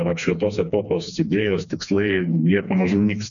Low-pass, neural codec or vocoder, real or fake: 7.2 kHz; codec, 16 kHz, 2 kbps, FreqCodec, smaller model; fake